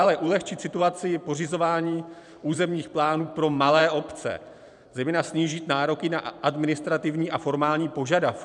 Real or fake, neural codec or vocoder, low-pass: fake; vocoder, 44.1 kHz, 128 mel bands every 512 samples, BigVGAN v2; 10.8 kHz